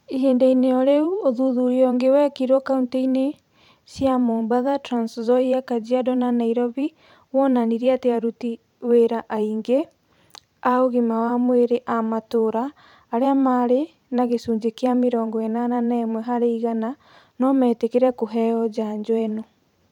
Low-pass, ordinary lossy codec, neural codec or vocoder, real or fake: 19.8 kHz; none; vocoder, 44.1 kHz, 128 mel bands every 512 samples, BigVGAN v2; fake